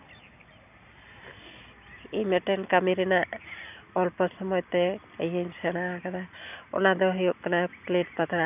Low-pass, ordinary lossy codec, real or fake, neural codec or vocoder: 3.6 kHz; none; real; none